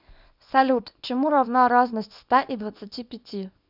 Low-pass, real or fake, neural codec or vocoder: 5.4 kHz; fake; codec, 24 kHz, 0.9 kbps, WavTokenizer, small release